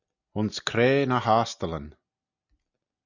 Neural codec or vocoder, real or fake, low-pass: none; real; 7.2 kHz